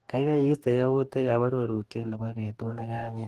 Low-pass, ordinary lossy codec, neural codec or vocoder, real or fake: 14.4 kHz; Opus, 24 kbps; codec, 44.1 kHz, 2.6 kbps, DAC; fake